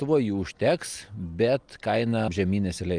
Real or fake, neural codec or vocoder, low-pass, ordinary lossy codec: real; none; 9.9 kHz; Opus, 32 kbps